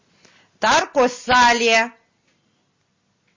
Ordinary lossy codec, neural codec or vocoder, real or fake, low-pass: MP3, 32 kbps; none; real; 7.2 kHz